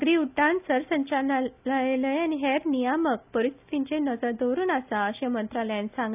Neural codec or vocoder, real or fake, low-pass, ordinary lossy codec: none; real; 3.6 kHz; none